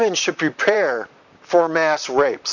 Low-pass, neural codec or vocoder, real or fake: 7.2 kHz; vocoder, 44.1 kHz, 128 mel bands, Pupu-Vocoder; fake